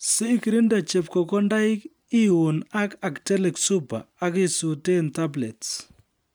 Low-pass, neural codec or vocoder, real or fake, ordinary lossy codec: none; none; real; none